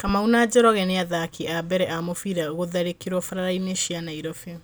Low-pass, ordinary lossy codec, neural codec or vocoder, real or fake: none; none; none; real